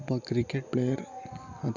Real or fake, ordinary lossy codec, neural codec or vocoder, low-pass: real; none; none; 7.2 kHz